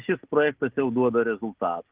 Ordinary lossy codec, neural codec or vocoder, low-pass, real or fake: Opus, 16 kbps; none; 3.6 kHz; real